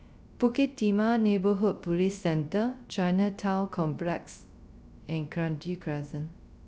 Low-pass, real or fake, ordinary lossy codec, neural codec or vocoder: none; fake; none; codec, 16 kHz, 0.3 kbps, FocalCodec